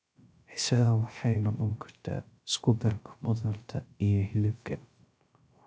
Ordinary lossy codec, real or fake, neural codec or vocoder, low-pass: none; fake; codec, 16 kHz, 0.3 kbps, FocalCodec; none